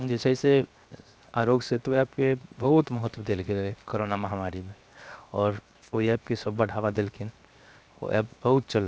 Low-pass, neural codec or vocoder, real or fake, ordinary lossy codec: none; codec, 16 kHz, 0.7 kbps, FocalCodec; fake; none